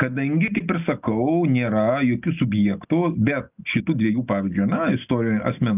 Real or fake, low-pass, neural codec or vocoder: real; 3.6 kHz; none